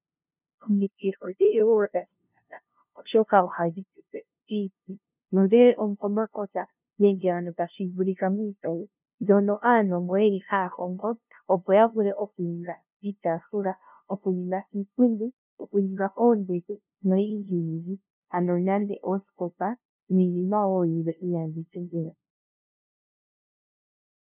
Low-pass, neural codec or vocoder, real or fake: 3.6 kHz; codec, 16 kHz, 0.5 kbps, FunCodec, trained on LibriTTS, 25 frames a second; fake